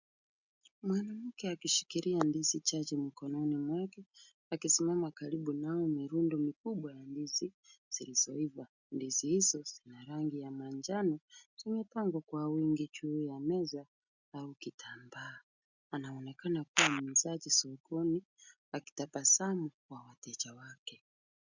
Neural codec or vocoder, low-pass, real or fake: none; 7.2 kHz; real